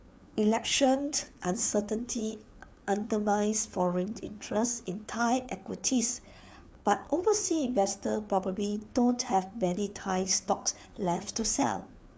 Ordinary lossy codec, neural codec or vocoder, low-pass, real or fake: none; codec, 16 kHz, 4 kbps, FreqCodec, larger model; none; fake